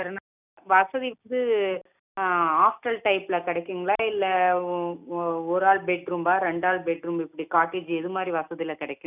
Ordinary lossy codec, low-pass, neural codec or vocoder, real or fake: none; 3.6 kHz; none; real